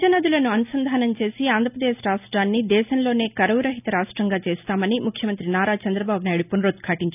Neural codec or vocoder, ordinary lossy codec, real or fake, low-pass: none; none; real; 3.6 kHz